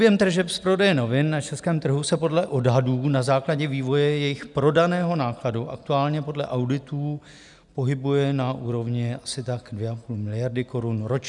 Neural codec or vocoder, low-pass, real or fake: none; 10.8 kHz; real